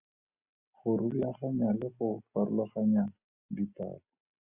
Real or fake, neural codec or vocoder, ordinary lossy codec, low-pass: real; none; Opus, 64 kbps; 3.6 kHz